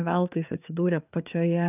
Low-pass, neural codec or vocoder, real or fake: 3.6 kHz; codec, 16 kHz, 4 kbps, FreqCodec, larger model; fake